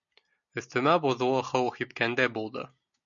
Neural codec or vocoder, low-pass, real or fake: none; 7.2 kHz; real